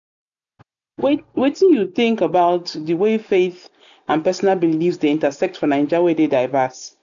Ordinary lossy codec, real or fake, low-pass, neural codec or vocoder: none; real; 7.2 kHz; none